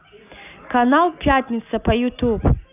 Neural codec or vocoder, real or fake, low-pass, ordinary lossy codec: none; real; 3.6 kHz; none